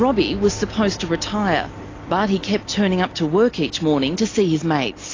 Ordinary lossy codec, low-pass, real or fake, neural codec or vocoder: AAC, 32 kbps; 7.2 kHz; real; none